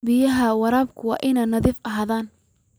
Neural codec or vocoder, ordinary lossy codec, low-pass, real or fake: none; none; none; real